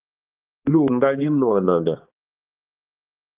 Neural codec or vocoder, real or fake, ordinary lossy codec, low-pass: codec, 16 kHz, 2 kbps, X-Codec, HuBERT features, trained on balanced general audio; fake; Opus, 32 kbps; 3.6 kHz